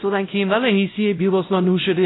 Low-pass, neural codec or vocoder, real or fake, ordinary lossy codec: 7.2 kHz; codec, 16 kHz, 0.5 kbps, X-Codec, WavLM features, trained on Multilingual LibriSpeech; fake; AAC, 16 kbps